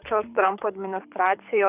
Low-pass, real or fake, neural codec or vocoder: 3.6 kHz; fake; codec, 24 kHz, 6 kbps, HILCodec